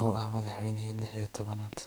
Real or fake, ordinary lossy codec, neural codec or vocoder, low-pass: fake; none; codec, 44.1 kHz, 2.6 kbps, SNAC; none